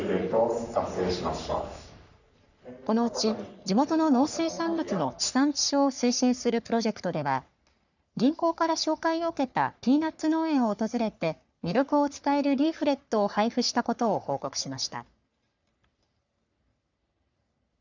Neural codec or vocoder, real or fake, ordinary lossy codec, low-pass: codec, 44.1 kHz, 3.4 kbps, Pupu-Codec; fake; none; 7.2 kHz